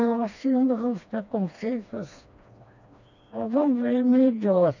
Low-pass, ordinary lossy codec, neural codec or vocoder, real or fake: 7.2 kHz; none; codec, 16 kHz, 2 kbps, FreqCodec, smaller model; fake